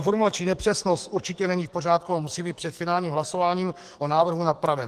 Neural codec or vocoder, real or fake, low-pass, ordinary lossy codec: codec, 44.1 kHz, 2.6 kbps, SNAC; fake; 14.4 kHz; Opus, 24 kbps